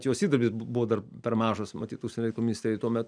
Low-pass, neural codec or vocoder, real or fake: 9.9 kHz; none; real